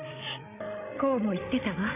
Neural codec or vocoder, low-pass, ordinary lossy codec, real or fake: codec, 16 kHz, 16 kbps, FreqCodec, larger model; 3.6 kHz; none; fake